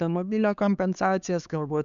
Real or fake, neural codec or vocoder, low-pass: fake; codec, 16 kHz, 4 kbps, X-Codec, HuBERT features, trained on LibriSpeech; 7.2 kHz